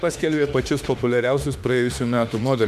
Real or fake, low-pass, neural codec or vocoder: fake; 14.4 kHz; autoencoder, 48 kHz, 32 numbers a frame, DAC-VAE, trained on Japanese speech